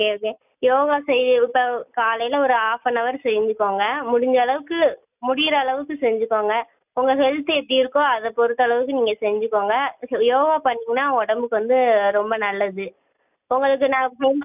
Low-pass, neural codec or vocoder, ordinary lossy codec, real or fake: 3.6 kHz; none; none; real